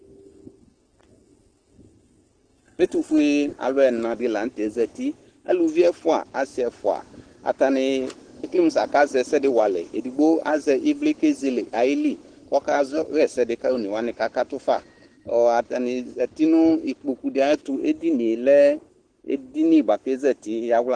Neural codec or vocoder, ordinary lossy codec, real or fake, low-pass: codec, 44.1 kHz, 7.8 kbps, Pupu-Codec; Opus, 16 kbps; fake; 9.9 kHz